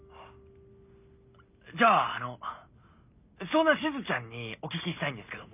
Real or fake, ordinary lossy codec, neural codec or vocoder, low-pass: real; none; none; 3.6 kHz